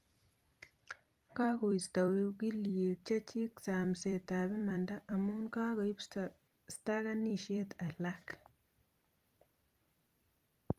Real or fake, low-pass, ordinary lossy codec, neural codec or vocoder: fake; 14.4 kHz; Opus, 32 kbps; vocoder, 44.1 kHz, 128 mel bands every 256 samples, BigVGAN v2